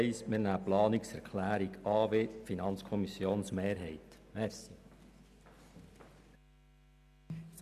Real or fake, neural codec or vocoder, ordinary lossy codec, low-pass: real; none; none; 14.4 kHz